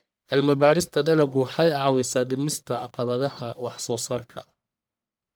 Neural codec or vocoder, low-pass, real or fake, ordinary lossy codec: codec, 44.1 kHz, 1.7 kbps, Pupu-Codec; none; fake; none